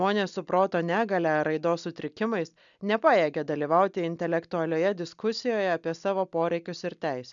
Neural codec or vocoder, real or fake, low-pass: none; real; 7.2 kHz